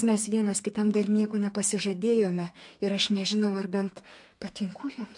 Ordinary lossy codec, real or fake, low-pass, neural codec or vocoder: MP3, 64 kbps; fake; 10.8 kHz; codec, 32 kHz, 1.9 kbps, SNAC